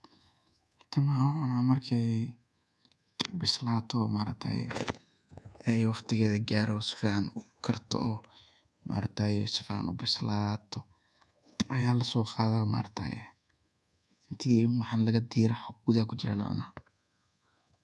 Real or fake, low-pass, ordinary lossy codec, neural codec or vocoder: fake; none; none; codec, 24 kHz, 1.2 kbps, DualCodec